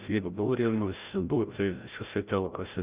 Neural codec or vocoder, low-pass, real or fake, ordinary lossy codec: codec, 16 kHz, 0.5 kbps, FreqCodec, larger model; 3.6 kHz; fake; Opus, 32 kbps